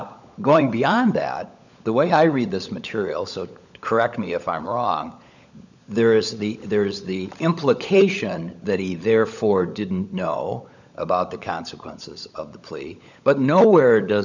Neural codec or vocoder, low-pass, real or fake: codec, 16 kHz, 16 kbps, FunCodec, trained on LibriTTS, 50 frames a second; 7.2 kHz; fake